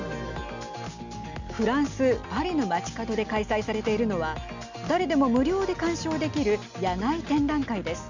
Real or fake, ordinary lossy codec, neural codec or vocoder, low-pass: real; none; none; 7.2 kHz